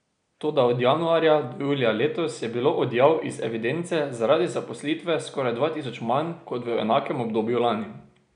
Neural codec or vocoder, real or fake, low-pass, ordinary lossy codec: none; real; 9.9 kHz; none